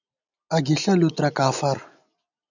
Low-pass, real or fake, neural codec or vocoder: 7.2 kHz; real; none